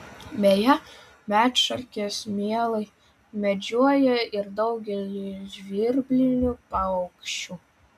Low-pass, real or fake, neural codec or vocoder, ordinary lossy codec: 14.4 kHz; real; none; MP3, 96 kbps